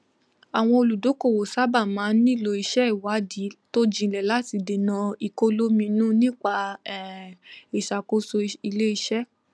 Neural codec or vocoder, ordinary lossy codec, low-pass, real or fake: none; none; none; real